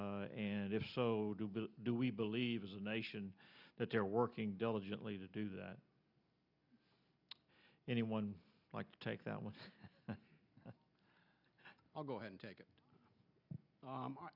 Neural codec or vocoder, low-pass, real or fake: none; 5.4 kHz; real